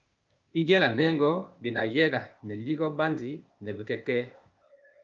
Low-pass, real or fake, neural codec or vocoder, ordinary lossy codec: 7.2 kHz; fake; codec, 16 kHz, 0.8 kbps, ZipCodec; Opus, 24 kbps